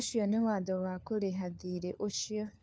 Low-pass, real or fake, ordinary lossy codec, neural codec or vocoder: none; fake; none; codec, 16 kHz, 4 kbps, FunCodec, trained on LibriTTS, 50 frames a second